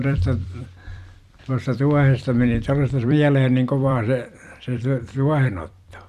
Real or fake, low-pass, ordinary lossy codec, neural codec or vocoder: fake; 14.4 kHz; Opus, 64 kbps; vocoder, 44.1 kHz, 128 mel bands every 256 samples, BigVGAN v2